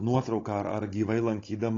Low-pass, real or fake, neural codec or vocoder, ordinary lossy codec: 7.2 kHz; fake; codec, 16 kHz, 16 kbps, FunCodec, trained on Chinese and English, 50 frames a second; AAC, 32 kbps